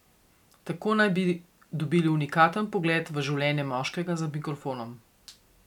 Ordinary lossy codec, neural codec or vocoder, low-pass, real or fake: none; none; 19.8 kHz; real